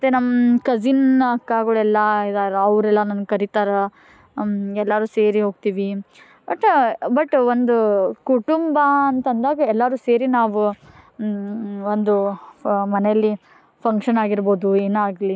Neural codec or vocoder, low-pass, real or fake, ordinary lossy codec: none; none; real; none